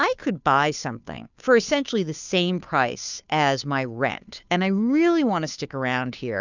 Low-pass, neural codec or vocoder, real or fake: 7.2 kHz; codec, 16 kHz, 2 kbps, FunCodec, trained on Chinese and English, 25 frames a second; fake